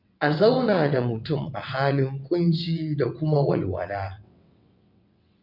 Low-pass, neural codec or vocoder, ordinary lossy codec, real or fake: 5.4 kHz; vocoder, 22.05 kHz, 80 mel bands, WaveNeXt; none; fake